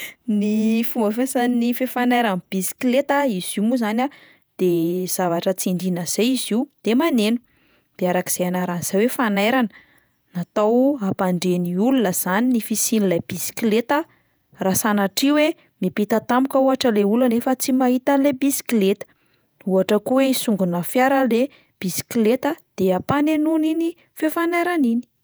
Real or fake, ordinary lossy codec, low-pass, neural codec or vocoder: fake; none; none; vocoder, 48 kHz, 128 mel bands, Vocos